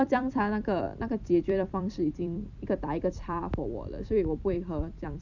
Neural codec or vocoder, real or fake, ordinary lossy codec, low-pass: vocoder, 44.1 kHz, 128 mel bands every 256 samples, BigVGAN v2; fake; none; 7.2 kHz